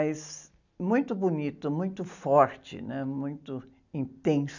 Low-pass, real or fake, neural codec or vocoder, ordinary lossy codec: 7.2 kHz; real; none; none